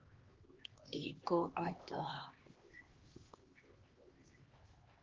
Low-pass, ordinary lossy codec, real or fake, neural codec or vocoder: 7.2 kHz; Opus, 16 kbps; fake; codec, 16 kHz, 2 kbps, X-Codec, HuBERT features, trained on LibriSpeech